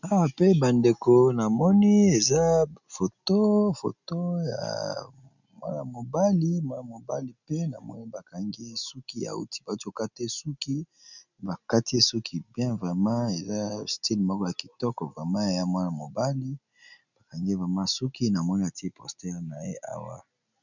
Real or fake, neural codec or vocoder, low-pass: real; none; 7.2 kHz